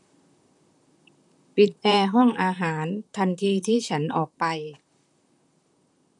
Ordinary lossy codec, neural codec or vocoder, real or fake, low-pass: none; vocoder, 44.1 kHz, 128 mel bands, Pupu-Vocoder; fake; 10.8 kHz